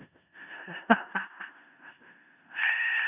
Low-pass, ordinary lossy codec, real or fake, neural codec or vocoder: 3.6 kHz; none; fake; codec, 16 kHz in and 24 kHz out, 0.4 kbps, LongCat-Audio-Codec, four codebook decoder